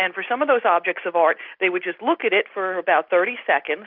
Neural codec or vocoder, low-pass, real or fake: none; 5.4 kHz; real